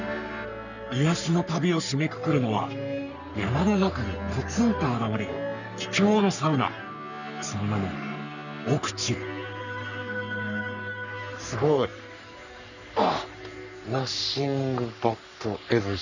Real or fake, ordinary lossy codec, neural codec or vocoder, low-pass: fake; none; codec, 44.1 kHz, 3.4 kbps, Pupu-Codec; 7.2 kHz